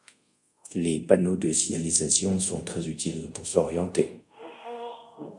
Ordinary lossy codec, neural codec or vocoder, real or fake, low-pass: MP3, 64 kbps; codec, 24 kHz, 0.5 kbps, DualCodec; fake; 10.8 kHz